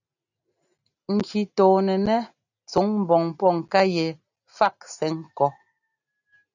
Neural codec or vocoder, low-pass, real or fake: none; 7.2 kHz; real